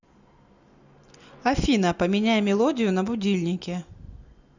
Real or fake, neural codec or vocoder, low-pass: real; none; 7.2 kHz